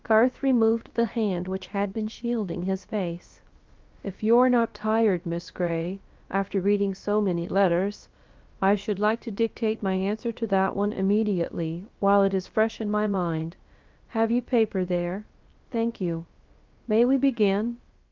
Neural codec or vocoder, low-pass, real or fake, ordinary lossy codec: codec, 16 kHz, about 1 kbps, DyCAST, with the encoder's durations; 7.2 kHz; fake; Opus, 32 kbps